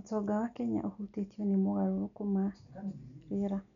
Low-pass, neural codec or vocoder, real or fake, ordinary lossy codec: 7.2 kHz; none; real; none